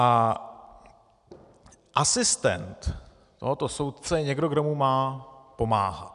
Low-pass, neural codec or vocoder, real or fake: 10.8 kHz; none; real